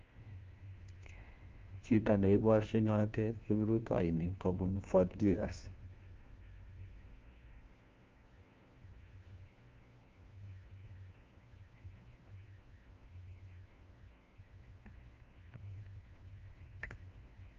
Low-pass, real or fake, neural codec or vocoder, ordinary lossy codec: 7.2 kHz; fake; codec, 16 kHz, 1 kbps, FunCodec, trained on LibriTTS, 50 frames a second; Opus, 24 kbps